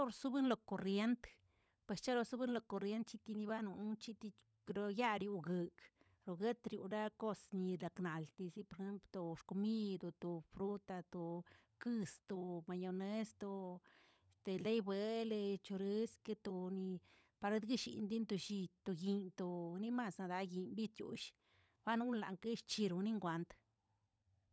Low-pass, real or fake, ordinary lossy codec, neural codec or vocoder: none; fake; none; codec, 16 kHz, 16 kbps, FunCodec, trained on LibriTTS, 50 frames a second